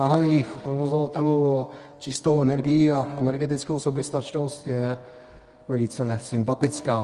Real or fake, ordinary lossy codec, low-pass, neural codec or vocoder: fake; Opus, 24 kbps; 10.8 kHz; codec, 24 kHz, 0.9 kbps, WavTokenizer, medium music audio release